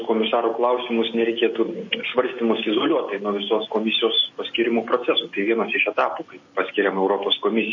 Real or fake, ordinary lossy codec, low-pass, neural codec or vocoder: real; MP3, 32 kbps; 7.2 kHz; none